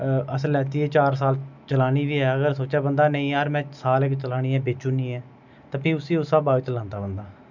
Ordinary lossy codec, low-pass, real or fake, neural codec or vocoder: none; 7.2 kHz; real; none